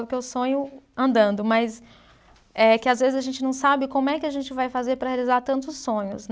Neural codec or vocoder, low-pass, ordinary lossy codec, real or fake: none; none; none; real